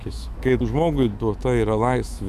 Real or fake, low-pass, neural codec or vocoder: fake; 14.4 kHz; autoencoder, 48 kHz, 128 numbers a frame, DAC-VAE, trained on Japanese speech